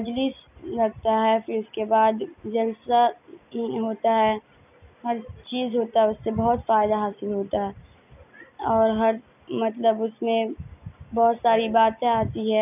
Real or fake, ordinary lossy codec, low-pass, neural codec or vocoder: real; none; 3.6 kHz; none